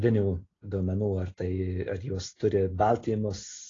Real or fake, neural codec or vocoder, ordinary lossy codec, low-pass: real; none; AAC, 32 kbps; 7.2 kHz